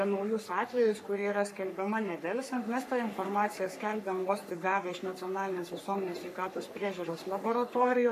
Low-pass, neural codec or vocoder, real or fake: 14.4 kHz; codec, 44.1 kHz, 3.4 kbps, Pupu-Codec; fake